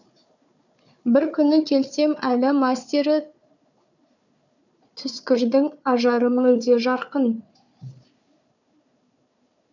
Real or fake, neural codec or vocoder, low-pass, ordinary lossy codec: fake; codec, 16 kHz, 4 kbps, FunCodec, trained on Chinese and English, 50 frames a second; 7.2 kHz; none